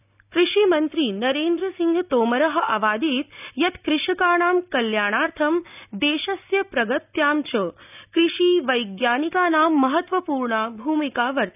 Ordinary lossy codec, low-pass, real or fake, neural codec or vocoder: none; 3.6 kHz; real; none